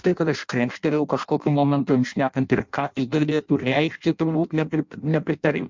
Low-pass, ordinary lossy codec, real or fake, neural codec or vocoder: 7.2 kHz; MP3, 64 kbps; fake; codec, 16 kHz in and 24 kHz out, 0.6 kbps, FireRedTTS-2 codec